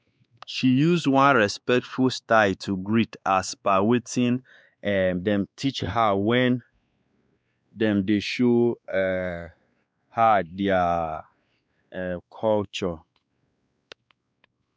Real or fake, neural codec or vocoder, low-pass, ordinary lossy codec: fake; codec, 16 kHz, 2 kbps, X-Codec, WavLM features, trained on Multilingual LibriSpeech; none; none